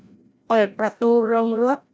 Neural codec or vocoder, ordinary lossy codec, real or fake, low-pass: codec, 16 kHz, 0.5 kbps, FreqCodec, larger model; none; fake; none